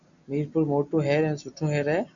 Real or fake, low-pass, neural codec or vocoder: real; 7.2 kHz; none